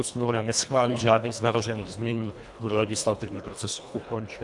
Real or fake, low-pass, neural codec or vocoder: fake; 10.8 kHz; codec, 24 kHz, 1.5 kbps, HILCodec